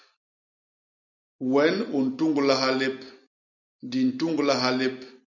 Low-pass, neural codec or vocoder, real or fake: 7.2 kHz; none; real